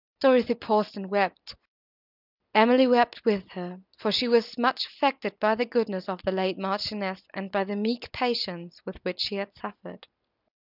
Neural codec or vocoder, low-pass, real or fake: none; 5.4 kHz; real